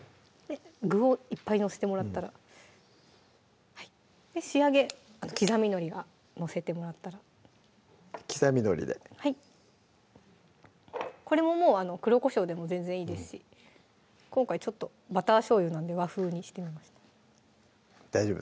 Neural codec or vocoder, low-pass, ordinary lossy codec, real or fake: none; none; none; real